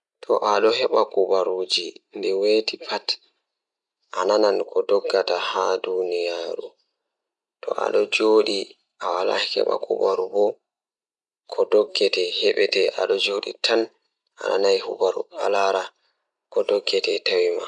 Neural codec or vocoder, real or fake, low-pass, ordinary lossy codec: none; real; 10.8 kHz; none